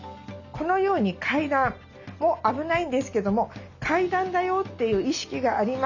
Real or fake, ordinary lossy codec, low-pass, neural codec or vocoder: real; none; 7.2 kHz; none